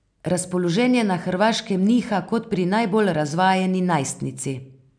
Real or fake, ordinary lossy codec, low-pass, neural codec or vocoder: real; none; 9.9 kHz; none